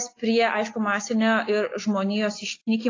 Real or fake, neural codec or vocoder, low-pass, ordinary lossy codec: real; none; 7.2 kHz; AAC, 32 kbps